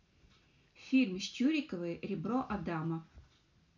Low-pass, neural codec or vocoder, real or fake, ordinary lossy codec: 7.2 kHz; none; real; none